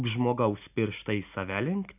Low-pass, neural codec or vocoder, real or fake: 3.6 kHz; none; real